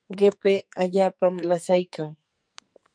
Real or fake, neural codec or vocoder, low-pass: fake; codec, 44.1 kHz, 2.6 kbps, SNAC; 9.9 kHz